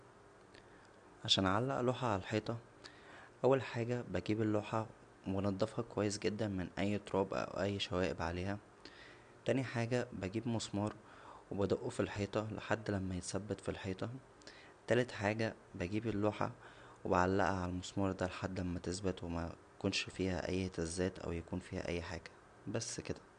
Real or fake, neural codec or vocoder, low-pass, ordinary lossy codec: real; none; 9.9 kHz; none